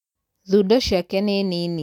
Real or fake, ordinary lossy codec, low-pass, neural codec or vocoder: real; none; 19.8 kHz; none